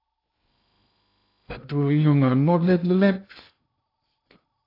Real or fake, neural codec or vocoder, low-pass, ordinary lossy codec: fake; codec, 16 kHz in and 24 kHz out, 0.8 kbps, FocalCodec, streaming, 65536 codes; 5.4 kHz; AAC, 32 kbps